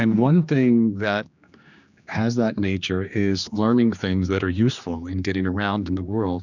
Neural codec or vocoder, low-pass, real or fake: codec, 16 kHz, 2 kbps, X-Codec, HuBERT features, trained on general audio; 7.2 kHz; fake